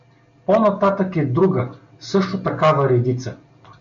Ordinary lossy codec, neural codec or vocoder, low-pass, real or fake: MP3, 64 kbps; none; 7.2 kHz; real